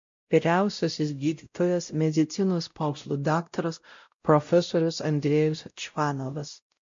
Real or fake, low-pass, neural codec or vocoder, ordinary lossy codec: fake; 7.2 kHz; codec, 16 kHz, 0.5 kbps, X-Codec, WavLM features, trained on Multilingual LibriSpeech; MP3, 48 kbps